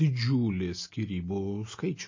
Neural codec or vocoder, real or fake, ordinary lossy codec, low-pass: none; real; MP3, 32 kbps; 7.2 kHz